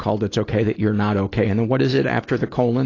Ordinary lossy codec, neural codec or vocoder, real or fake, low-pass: AAC, 32 kbps; none; real; 7.2 kHz